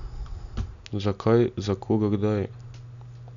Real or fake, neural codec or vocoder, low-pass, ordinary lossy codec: real; none; 7.2 kHz; none